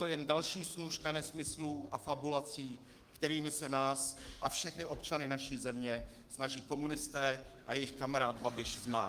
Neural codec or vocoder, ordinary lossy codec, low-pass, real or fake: codec, 32 kHz, 1.9 kbps, SNAC; Opus, 32 kbps; 14.4 kHz; fake